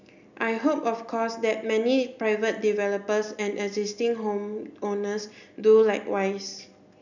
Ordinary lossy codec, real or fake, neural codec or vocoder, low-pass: none; real; none; 7.2 kHz